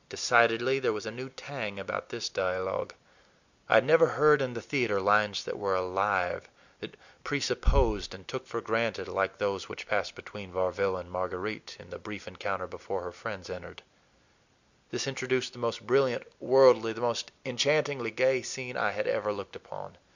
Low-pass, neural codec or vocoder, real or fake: 7.2 kHz; none; real